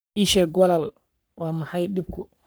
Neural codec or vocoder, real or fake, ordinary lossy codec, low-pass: codec, 44.1 kHz, 3.4 kbps, Pupu-Codec; fake; none; none